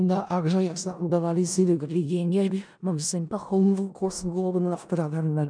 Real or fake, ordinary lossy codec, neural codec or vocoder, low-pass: fake; none; codec, 16 kHz in and 24 kHz out, 0.4 kbps, LongCat-Audio-Codec, four codebook decoder; 9.9 kHz